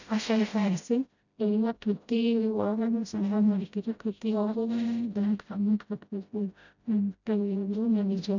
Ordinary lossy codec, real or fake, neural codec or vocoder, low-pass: none; fake; codec, 16 kHz, 0.5 kbps, FreqCodec, smaller model; 7.2 kHz